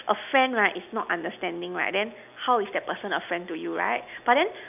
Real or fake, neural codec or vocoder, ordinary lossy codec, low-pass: real; none; none; 3.6 kHz